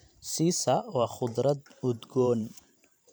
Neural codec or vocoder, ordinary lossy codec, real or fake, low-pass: vocoder, 44.1 kHz, 128 mel bands every 512 samples, BigVGAN v2; none; fake; none